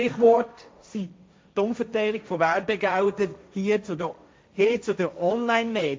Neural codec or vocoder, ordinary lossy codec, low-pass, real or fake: codec, 16 kHz, 1.1 kbps, Voila-Tokenizer; none; none; fake